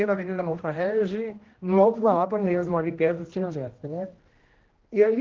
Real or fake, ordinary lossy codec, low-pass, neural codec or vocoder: fake; Opus, 16 kbps; 7.2 kHz; codec, 16 kHz, 1 kbps, X-Codec, HuBERT features, trained on general audio